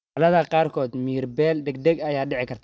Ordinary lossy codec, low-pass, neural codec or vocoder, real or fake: none; none; none; real